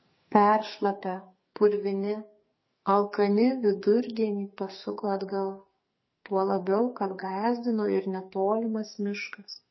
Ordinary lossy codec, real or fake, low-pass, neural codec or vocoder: MP3, 24 kbps; fake; 7.2 kHz; codec, 44.1 kHz, 2.6 kbps, SNAC